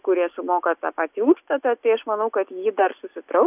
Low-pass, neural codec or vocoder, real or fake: 3.6 kHz; none; real